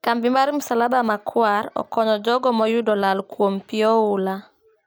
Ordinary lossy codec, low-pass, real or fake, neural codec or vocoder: none; none; real; none